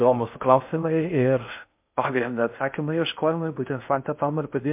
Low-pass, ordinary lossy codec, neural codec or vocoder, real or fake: 3.6 kHz; MP3, 32 kbps; codec, 16 kHz in and 24 kHz out, 0.6 kbps, FocalCodec, streaming, 4096 codes; fake